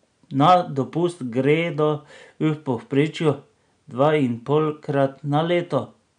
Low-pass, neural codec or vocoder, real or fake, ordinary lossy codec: 9.9 kHz; none; real; none